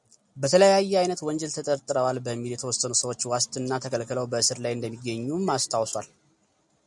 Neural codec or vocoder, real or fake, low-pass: none; real; 10.8 kHz